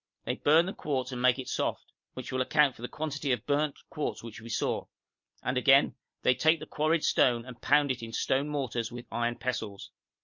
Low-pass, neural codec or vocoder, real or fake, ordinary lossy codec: 7.2 kHz; none; real; MP3, 48 kbps